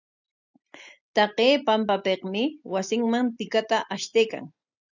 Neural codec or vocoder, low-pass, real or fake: none; 7.2 kHz; real